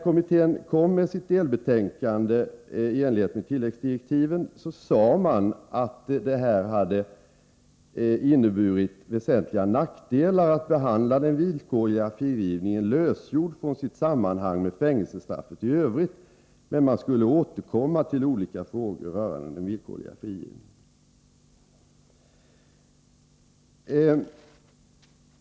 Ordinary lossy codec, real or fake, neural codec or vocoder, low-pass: none; real; none; none